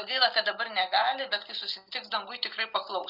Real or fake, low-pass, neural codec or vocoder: real; 5.4 kHz; none